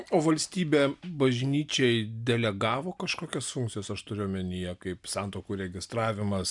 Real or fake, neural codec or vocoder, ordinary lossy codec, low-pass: real; none; MP3, 96 kbps; 10.8 kHz